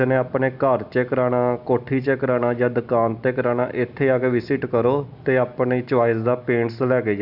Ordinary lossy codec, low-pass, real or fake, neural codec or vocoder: none; 5.4 kHz; real; none